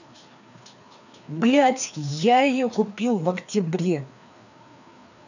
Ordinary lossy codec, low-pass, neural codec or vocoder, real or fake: none; 7.2 kHz; codec, 16 kHz, 2 kbps, FreqCodec, larger model; fake